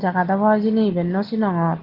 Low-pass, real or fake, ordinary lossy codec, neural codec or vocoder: 5.4 kHz; real; Opus, 16 kbps; none